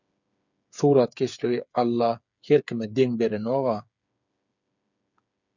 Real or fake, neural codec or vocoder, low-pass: fake; codec, 16 kHz, 8 kbps, FreqCodec, smaller model; 7.2 kHz